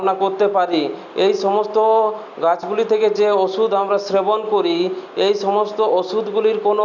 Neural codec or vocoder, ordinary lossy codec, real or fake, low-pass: none; none; real; 7.2 kHz